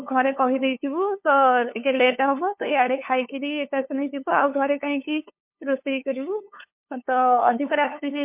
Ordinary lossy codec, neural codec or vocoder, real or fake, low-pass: MP3, 32 kbps; codec, 16 kHz, 2 kbps, FunCodec, trained on LibriTTS, 25 frames a second; fake; 3.6 kHz